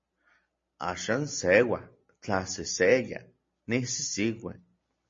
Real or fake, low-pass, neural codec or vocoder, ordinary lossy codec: real; 7.2 kHz; none; MP3, 32 kbps